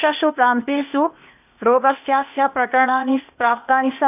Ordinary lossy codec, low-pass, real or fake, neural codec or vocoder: none; 3.6 kHz; fake; codec, 16 kHz, 0.8 kbps, ZipCodec